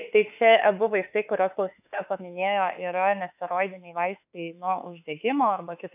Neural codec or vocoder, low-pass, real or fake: autoencoder, 48 kHz, 32 numbers a frame, DAC-VAE, trained on Japanese speech; 3.6 kHz; fake